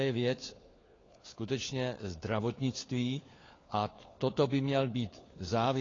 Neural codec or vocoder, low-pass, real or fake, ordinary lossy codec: codec, 16 kHz, 4 kbps, FunCodec, trained on LibriTTS, 50 frames a second; 7.2 kHz; fake; AAC, 32 kbps